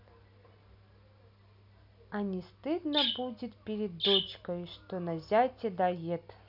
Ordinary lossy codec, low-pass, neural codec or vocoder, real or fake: none; 5.4 kHz; none; real